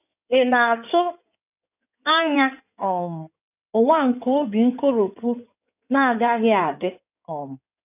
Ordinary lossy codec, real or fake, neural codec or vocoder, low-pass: none; fake; codec, 16 kHz in and 24 kHz out, 2.2 kbps, FireRedTTS-2 codec; 3.6 kHz